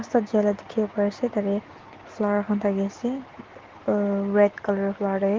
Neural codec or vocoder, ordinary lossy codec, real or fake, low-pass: none; Opus, 16 kbps; real; 7.2 kHz